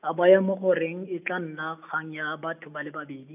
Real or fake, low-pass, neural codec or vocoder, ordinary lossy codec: real; 3.6 kHz; none; none